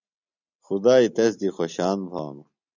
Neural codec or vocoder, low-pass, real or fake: none; 7.2 kHz; real